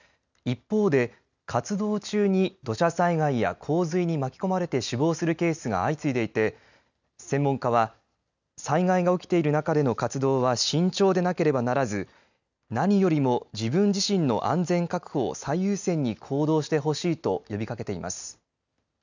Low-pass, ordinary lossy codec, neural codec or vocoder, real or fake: 7.2 kHz; none; none; real